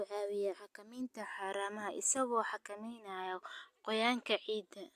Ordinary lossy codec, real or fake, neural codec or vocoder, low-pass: AAC, 96 kbps; real; none; 14.4 kHz